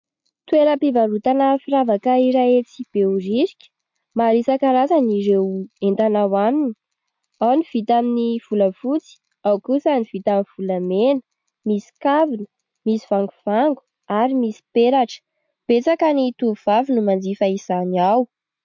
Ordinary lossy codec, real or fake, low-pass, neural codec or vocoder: MP3, 48 kbps; real; 7.2 kHz; none